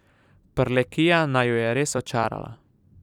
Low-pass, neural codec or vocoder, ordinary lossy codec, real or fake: 19.8 kHz; vocoder, 44.1 kHz, 128 mel bands every 256 samples, BigVGAN v2; none; fake